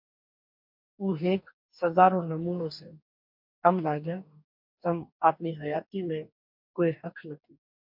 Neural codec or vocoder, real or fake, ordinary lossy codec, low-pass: codec, 44.1 kHz, 2.6 kbps, DAC; fake; MP3, 48 kbps; 5.4 kHz